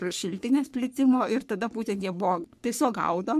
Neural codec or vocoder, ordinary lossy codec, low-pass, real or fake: codec, 44.1 kHz, 3.4 kbps, Pupu-Codec; MP3, 96 kbps; 14.4 kHz; fake